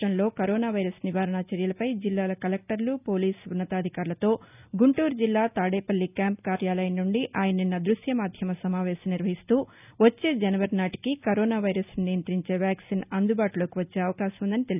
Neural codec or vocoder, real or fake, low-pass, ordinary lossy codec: none; real; 3.6 kHz; none